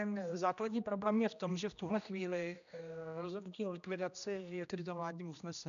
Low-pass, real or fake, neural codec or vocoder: 7.2 kHz; fake; codec, 16 kHz, 1 kbps, X-Codec, HuBERT features, trained on general audio